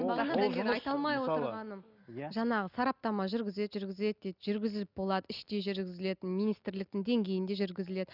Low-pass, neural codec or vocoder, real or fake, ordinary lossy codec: 5.4 kHz; none; real; none